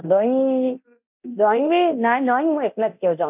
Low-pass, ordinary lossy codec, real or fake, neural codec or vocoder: 3.6 kHz; none; fake; codec, 24 kHz, 0.9 kbps, DualCodec